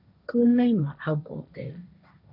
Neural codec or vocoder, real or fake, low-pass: codec, 16 kHz, 1.1 kbps, Voila-Tokenizer; fake; 5.4 kHz